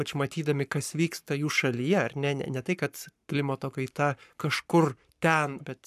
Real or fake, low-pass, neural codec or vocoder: fake; 14.4 kHz; codec, 44.1 kHz, 7.8 kbps, Pupu-Codec